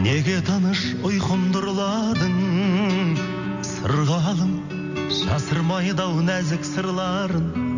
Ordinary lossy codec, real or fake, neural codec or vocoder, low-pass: none; real; none; 7.2 kHz